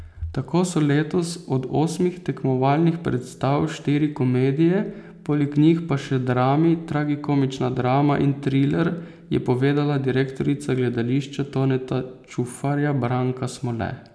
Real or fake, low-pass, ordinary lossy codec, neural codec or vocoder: real; none; none; none